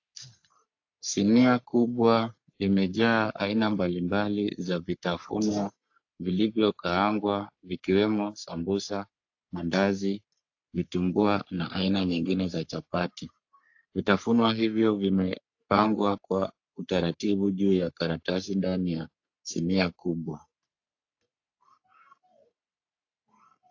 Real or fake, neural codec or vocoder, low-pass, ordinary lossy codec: fake; codec, 44.1 kHz, 3.4 kbps, Pupu-Codec; 7.2 kHz; AAC, 48 kbps